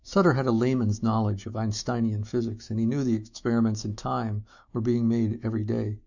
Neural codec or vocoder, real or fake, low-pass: autoencoder, 48 kHz, 128 numbers a frame, DAC-VAE, trained on Japanese speech; fake; 7.2 kHz